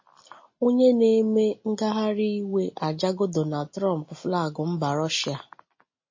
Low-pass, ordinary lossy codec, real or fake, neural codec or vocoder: 7.2 kHz; MP3, 32 kbps; real; none